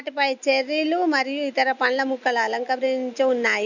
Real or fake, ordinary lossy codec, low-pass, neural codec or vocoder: real; none; 7.2 kHz; none